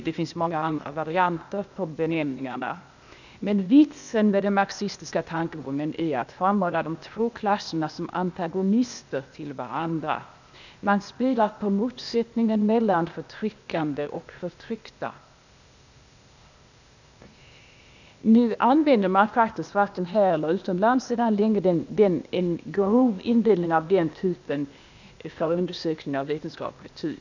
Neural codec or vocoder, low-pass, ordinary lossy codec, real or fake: codec, 16 kHz, 0.8 kbps, ZipCodec; 7.2 kHz; none; fake